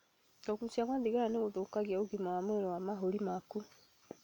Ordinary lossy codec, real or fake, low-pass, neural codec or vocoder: none; real; 19.8 kHz; none